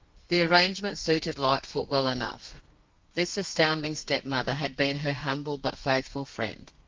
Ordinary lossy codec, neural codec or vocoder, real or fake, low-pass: Opus, 32 kbps; codec, 32 kHz, 1.9 kbps, SNAC; fake; 7.2 kHz